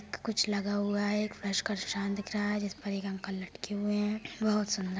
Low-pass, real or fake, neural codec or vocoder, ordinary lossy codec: none; real; none; none